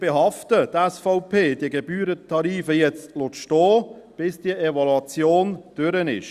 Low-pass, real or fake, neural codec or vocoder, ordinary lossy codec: 14.4 kHz; real; none; Opus, 64 kbps